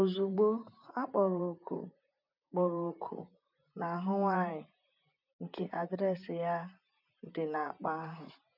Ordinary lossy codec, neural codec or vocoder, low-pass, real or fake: none; vocoder, 44.1 kHz, 128 mel bands, Pupu-Vocoder; 5.4 kHz; fake